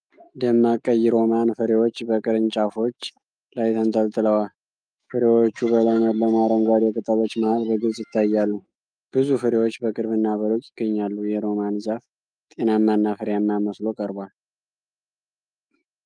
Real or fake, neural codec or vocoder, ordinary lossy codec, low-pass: fake; autoencoder, 48 kHz, 128 numbers a frame, DAC-VAE, trained on Japanese speech; Opus, 32 kbps; 9.9 kHz